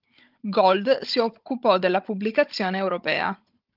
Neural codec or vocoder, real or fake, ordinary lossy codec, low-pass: codec, 16 kHz, 16 kbps, FunCodec, trained on Chinese and English, 50 frames a second; fake; Opus, 32 kbps; 5.4 kHz